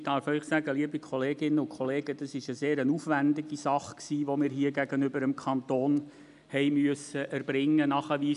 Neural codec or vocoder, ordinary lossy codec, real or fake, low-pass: none; none; real; 10.8 kHz